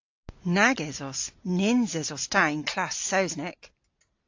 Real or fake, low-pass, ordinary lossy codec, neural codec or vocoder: real; 7.2 kHz; AAC, 48 kbps; none